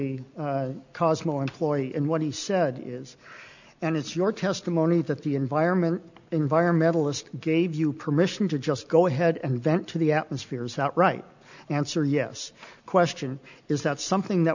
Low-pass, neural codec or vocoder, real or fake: 7.2 kHz; none; real